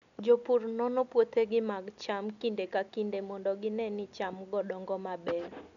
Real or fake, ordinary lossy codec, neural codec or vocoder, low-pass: real; none; none; 7.2 kHz